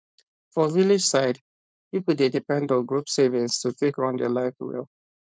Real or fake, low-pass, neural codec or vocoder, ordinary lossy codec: fake; none; codec, 16 kHz, 4.8 kbps, FACodec; none